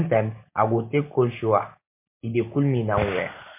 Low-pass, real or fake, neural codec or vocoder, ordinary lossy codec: 3.6 kHz; real; none; MP3, 24 kbps